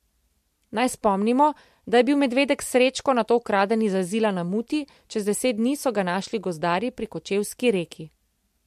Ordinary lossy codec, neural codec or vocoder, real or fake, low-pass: MP3, 64 kbps; none; real; 14.4 kHz